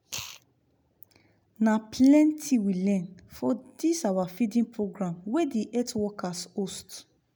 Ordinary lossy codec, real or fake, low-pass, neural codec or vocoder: none; real; none; none